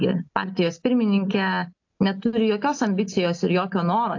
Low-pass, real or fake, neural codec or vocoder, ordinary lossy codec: 7.2 kHz; real; none; AAC, 48 kbps